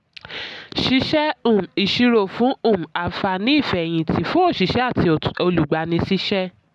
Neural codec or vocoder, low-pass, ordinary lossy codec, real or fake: none; none; none; real